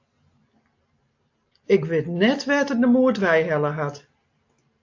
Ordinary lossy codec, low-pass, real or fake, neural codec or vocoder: AAC, 48 kbps; 7.2 kHz; real; none